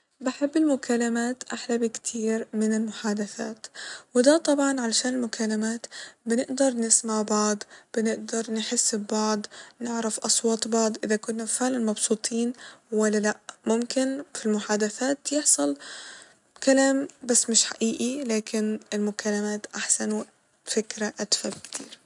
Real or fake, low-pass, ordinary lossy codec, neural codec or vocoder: real; 10.8 kHz; none; none